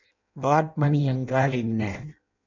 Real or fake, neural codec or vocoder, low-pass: fake; codec, 16 kHz in and 24 kHz out, 0.6 kbps, FireRedTTS-2 codec; 7.2 kHz